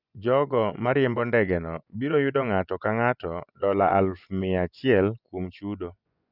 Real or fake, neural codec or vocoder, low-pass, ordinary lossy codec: fake; vocoder, 24 kHz, 100 mel bands, Vocos; 5.4 kHz; none